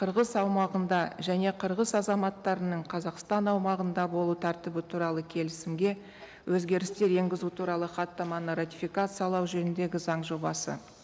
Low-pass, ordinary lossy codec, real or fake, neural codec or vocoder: none; none; real; none